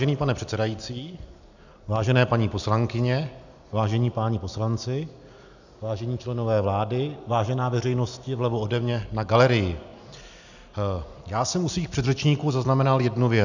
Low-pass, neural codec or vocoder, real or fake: 7.2 kHz; none; real